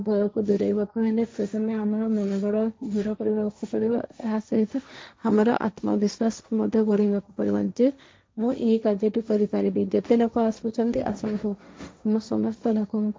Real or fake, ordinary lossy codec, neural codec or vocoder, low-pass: fake; none; codec, 16 kHz, 1.1 kbps, Voila-Tokenizer; none